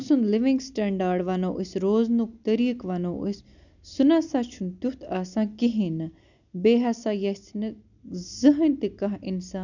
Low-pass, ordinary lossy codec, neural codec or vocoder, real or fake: 7.2 kHz; none; none; real